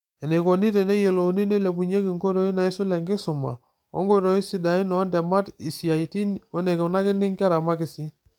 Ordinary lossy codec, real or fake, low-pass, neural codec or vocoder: MP3, 96 kbps; fake; 19.8 kHz; codec, 44.1 kHz, 7.8 kbps, DAC